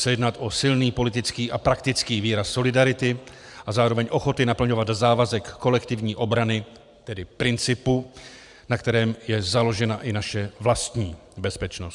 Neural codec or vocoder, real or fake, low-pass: vocoder, 44.1 kHz, 128 mel bands every 512 samples, BigVGAN v2; fake; 10.8 kHz